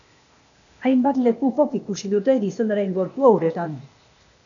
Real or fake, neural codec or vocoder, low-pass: fake; codec, 16 kHz, 0.8 kbps, ZipCodec; 7.2 kHz